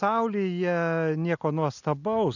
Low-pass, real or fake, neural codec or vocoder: 7.2 kHz; real; none